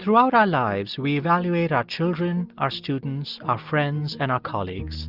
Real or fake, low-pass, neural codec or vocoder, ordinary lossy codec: real; 5.4 kHz; none; Opus, 24 kbps